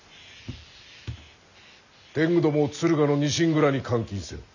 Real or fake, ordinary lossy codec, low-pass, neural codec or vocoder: real; none; 7.2 kHz; none